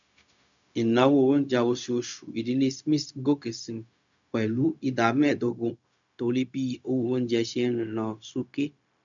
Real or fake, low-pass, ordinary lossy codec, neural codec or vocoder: fake; 7.2 kHz; none; codec, 16 kHz, 0.4 kbps, LongCat-Audio-Codec